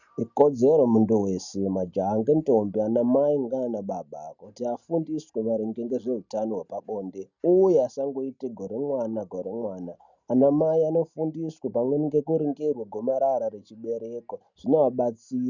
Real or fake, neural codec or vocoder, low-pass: real; none; 7.2 kHz